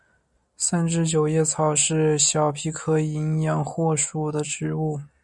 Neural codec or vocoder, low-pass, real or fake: none; 10.8 kHz; real